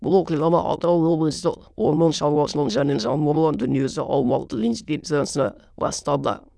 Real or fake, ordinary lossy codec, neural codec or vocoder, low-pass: fake; none; autoencoder, 22.05 kHz, a latent of 192 numbers a frame, VITS, trained on many speakers; none